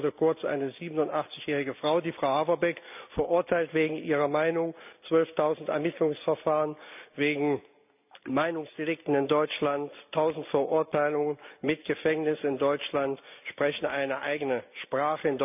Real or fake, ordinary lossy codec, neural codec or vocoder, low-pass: real; none; none; 3.6 kHz